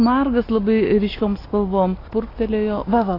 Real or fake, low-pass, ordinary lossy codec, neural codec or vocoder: real; 5.4 kHz; AAC, 24 kbps; none